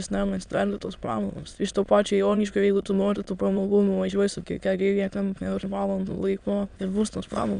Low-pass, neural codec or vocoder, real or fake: 9.9 kHz; autoencoder, 22.05 kHz, a latent of 192 numbers a frame, VITS, trained on many speakers; fake